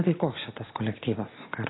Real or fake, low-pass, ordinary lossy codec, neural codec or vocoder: real; 7.2 kHz; AAC, 16 kbps; none